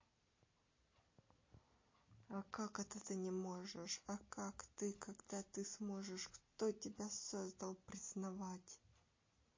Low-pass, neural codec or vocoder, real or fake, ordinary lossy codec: 7.2 kHz; none; real; MP3, 32 kbps